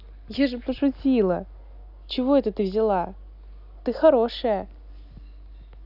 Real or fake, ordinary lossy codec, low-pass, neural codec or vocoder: real; none; 5.4 kHz; none